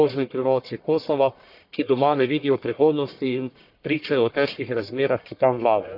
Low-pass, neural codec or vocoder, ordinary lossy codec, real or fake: 5.4 kHz; codec, 44.1 kHz, 1.7 kbps, Pupu-Codec; none; fake